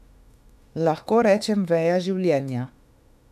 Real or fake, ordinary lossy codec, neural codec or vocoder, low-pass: fake; none; autoencoder, 48 kHz, 32 numbers a frame, DAC-VAE, trained on Japanese speech; 14.4 kHz